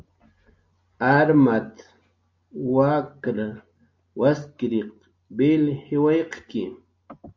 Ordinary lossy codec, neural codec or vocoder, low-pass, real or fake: MP3, 64 kbps; none; 7.2 kHz; real